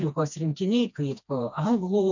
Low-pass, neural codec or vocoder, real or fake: 7.2 kHz; codec, 16 kHz, 2 kbps, FreqCodec, smaller model; fake